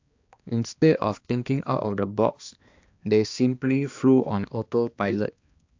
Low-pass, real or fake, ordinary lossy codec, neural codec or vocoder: 7.2 kHz; fake; AAC, 48 kbps; codec, 16 kHz, 2 kbps, X-Codec, HuBERT features, trained on balanced general audio